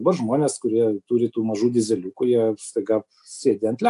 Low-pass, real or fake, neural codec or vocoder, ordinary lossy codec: 10.8 kHz; real; none; AAC, 96 kbps